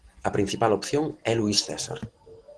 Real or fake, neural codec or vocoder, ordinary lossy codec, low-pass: real; none; Opus, 16 kbps; 9.9 kHz